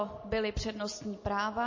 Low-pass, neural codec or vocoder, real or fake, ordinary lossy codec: 7.2 kHz; none; real; MP3, 32 kbps